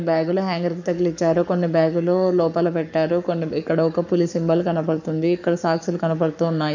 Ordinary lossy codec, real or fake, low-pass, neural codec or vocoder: none; fake; 7.2 kHz; codec, 44.1 kHz, 7.8 kbps, Pupu-Codec